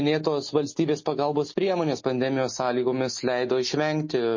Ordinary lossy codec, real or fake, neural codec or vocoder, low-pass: MP3, 32 kbps; real; none; 7.2 kHz